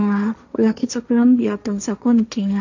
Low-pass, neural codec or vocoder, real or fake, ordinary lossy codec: 7.2 kHz; codec, 16 kHz, 1.1 kbps, Voila-Tokenizer; fake; none